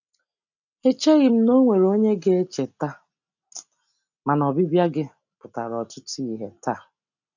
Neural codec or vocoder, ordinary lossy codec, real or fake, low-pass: none; none; real; 7.2 kHz